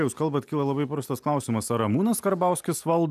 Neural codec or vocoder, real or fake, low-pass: none; real; 14.4 kHz